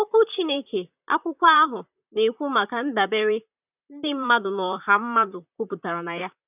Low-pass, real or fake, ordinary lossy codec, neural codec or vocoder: 3.6 kHz; fake; none; vocoder, 44.1 kHz, 128 mel bands, Pupu-Vocoder